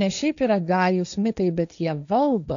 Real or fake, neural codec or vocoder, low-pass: fake; codec, 16 kHz, 1.1 kbps, Voila-Tokenizer; 7.2 kHz